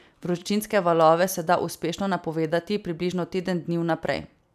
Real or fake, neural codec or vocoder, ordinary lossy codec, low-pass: real; none; none; 14.4 kHz